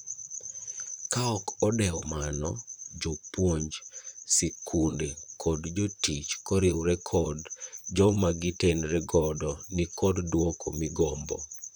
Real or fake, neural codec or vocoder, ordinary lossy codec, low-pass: fake; vocoder, 44.1 kHz, 128 mel bands, Pupu-Vocoder; none; none